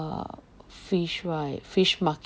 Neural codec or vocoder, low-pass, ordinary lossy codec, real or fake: none; none; none; real